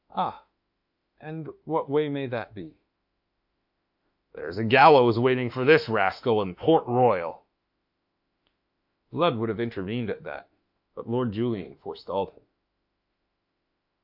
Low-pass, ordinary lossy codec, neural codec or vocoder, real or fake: 5.4 kHz; AAC, 48 kbps; autoencoder, 48 kHz, 32 numbers a frame, DAC-VAE, trained on Japanese speech; fake